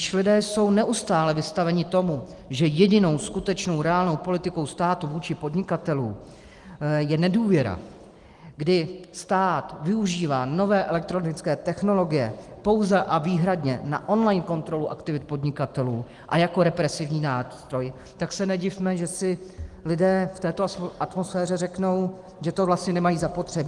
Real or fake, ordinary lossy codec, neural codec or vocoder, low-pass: real; Opus, 24 kbps; none; 10.8 kHz